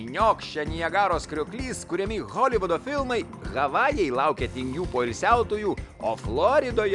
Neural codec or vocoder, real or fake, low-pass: none; real; 10.8 kHz